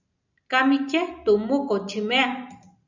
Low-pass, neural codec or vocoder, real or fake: 7.2 kHz; none; real